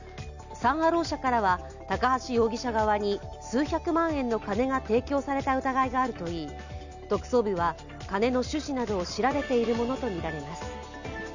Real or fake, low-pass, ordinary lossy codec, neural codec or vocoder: real; 7.2 kHz; none; none